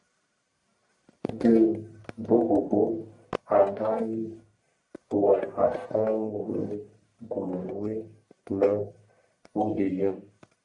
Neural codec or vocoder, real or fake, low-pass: codec, 44.1 kHz, 1.7 kbps, Pupu-Codec; fake; 10.8 kHz